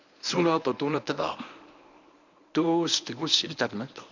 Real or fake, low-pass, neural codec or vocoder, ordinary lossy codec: fake; 7.2 kHz; codec, 24 kHz, 0.9 kbps, WavTokenizer, medium speech release version 1; none